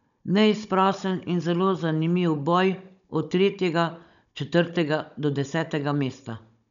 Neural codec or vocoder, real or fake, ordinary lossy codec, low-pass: codec, 16 kHz, 16 kbps, FunCodec, trained on Chinese and English, 50 frames a second; fake; none; 7.2 kHz